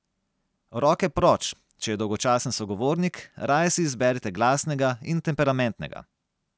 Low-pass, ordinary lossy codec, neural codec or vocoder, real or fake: none; none; none; real